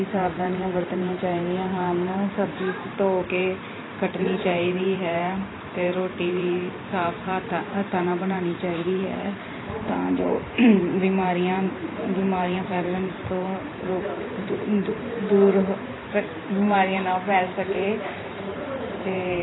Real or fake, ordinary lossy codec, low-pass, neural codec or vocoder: fake; AAC, 16 kbps; 7.2 kHz; vocoder, 44.1 kHz, 128 mel bands every 512 samples, BigVGAN v2